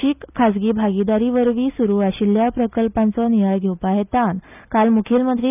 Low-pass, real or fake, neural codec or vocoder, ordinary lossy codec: 3.6 kHz; real; none; none